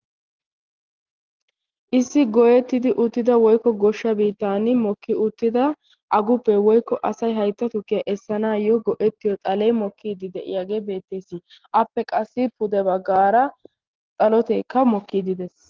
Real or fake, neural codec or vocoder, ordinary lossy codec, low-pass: real; none; Opus, 16 kbps; 7.2 kHz